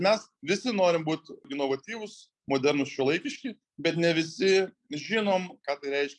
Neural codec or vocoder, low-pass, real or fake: none; 10.8 kHz; real